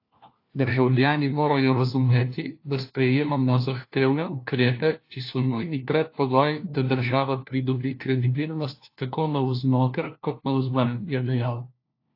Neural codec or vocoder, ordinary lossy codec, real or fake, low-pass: codec, 16 kHz, 1 kbps, FunCodec, trained on LibriTTS, 50 frames a second; AAC, 32 kbps; fake; 5.4 kHz